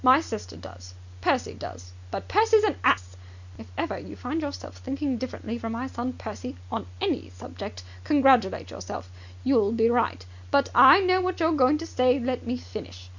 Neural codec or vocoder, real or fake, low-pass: none; real; 7.2 kHz